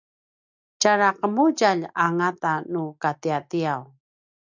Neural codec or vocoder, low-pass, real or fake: none; 7.2 kHz; real